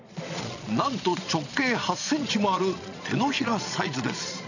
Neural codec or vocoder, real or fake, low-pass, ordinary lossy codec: vocoder, 22.05 kHz, 80 mel bands, Vocos; fake; 7.2 kHz; none